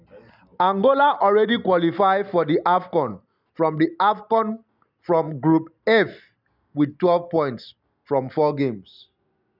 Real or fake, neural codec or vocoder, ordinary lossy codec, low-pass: real; none; none; 5.4 kHz